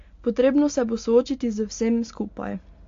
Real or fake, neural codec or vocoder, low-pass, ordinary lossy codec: real; none; 7.2 kHz; AAC, 48 kbps